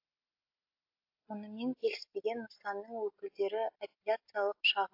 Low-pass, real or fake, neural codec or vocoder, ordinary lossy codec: 5.4 kHz; real; none; AAC, 48 kbps